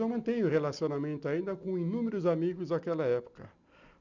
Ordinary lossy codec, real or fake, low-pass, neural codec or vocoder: none; real; 7.2 kHz; none